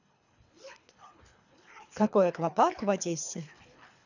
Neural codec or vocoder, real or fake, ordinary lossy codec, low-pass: codec, 24 kHz, 3 kbps, HILCodec; fake; none; 7.2 kHz